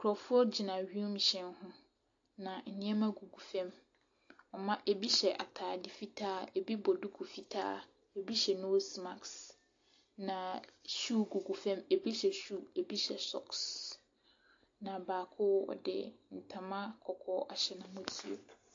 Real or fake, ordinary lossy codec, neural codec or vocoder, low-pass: real; AAC, 48 kbps; none; 7.2 kHz